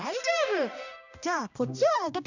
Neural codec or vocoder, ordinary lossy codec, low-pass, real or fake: codec, 16 kHz, 1 kbps, X-Codec, HuBERT features, trained on balanced general audio; none; 7.2 kHz; fake